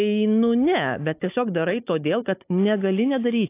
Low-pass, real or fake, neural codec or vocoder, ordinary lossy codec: 3.6 kHz; fake; codec, 16 kHz, 16 kbps, FunCodec, trained on Chinese and English, 50 frames a second; AAC, 24 kbps